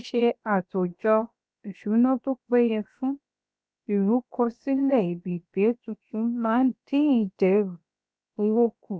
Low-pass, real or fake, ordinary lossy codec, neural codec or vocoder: none; fake; none; codec, 16 kHz, 0.7 kbps, FocalCodec